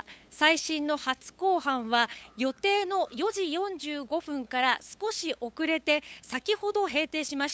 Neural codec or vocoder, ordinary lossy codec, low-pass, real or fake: codec, 16 kHz, 8 kbps, FunCodec, trained on LibriTTS, 25 frames a second; none; none; fake